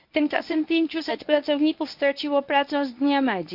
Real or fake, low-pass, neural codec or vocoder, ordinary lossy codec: fake; 5.4 kHz; codec, 24 kHz, 0.9 kbps, WavTokenizer, medium speech release version 1; none